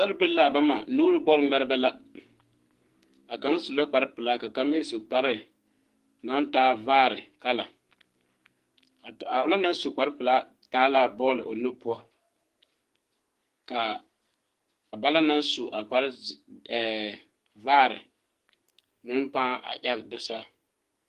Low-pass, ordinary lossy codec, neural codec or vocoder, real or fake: 14.4 kHz; Opus, 24 kbps; codec, 44.1 kHz, 2.6 kbps, SNAC; fake